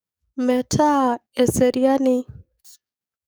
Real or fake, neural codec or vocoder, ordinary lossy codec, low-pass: fake; codec, 44.1 kHz, 7.8 kbps, DAC; none; none